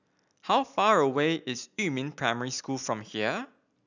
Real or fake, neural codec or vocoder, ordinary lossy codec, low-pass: real; none; none; 7.2 kHz